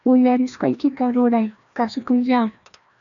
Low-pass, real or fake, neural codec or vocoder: 7.2 kHz; fake; codec, 16 kHz, 1 kbps, FreqCodec, larger model